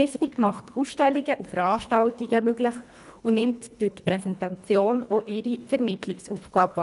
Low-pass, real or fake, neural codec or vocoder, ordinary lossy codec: 10.8 kHz; fake; codec, 24 kHz, 1.5 kbps, HILCodec; none